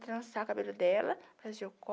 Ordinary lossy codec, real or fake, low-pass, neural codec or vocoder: none; real; none; none